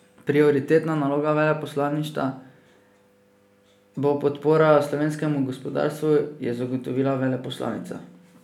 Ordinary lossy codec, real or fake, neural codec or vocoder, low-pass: none; real; none; 19.8 kHz